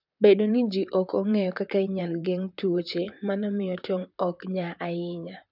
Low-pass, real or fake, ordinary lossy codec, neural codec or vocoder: 5.4 kHz; fake; none; vocoder, 44.1 kHz, 128 mel bands, Pupu-Vocoder